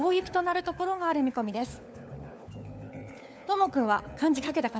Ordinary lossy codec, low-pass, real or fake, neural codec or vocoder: none; none; fake; codec, 16 kHz, 4 kbps, FunCodec, trained on LibriTTS, 50 frames a second